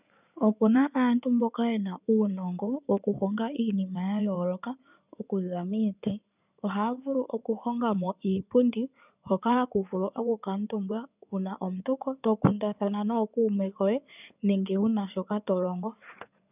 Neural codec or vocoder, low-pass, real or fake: codec, 16 kHz in and 24 kHz out, 2.2 kbps, FireRedTTS-2 codec; 3.6 kHz; fake